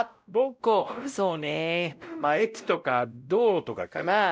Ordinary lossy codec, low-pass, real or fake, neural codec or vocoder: none; none; fake; codec, 16 kHz, 0.5 kbps, X-Codec, WavLM features, trained on Multilingual LibriSpeech